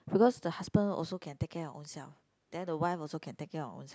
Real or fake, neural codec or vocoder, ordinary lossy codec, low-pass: real; none; none; none